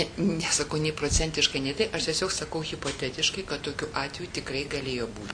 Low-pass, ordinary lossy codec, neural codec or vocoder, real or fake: 9.9 kHz; Opus, 64 kbps; none; real